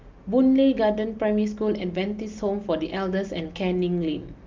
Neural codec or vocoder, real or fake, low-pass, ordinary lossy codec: none; real; 7.2 kHz; Opus, 24 kbps